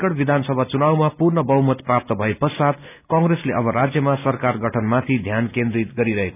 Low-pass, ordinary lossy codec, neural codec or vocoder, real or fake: 3.6 kHz; none; none; real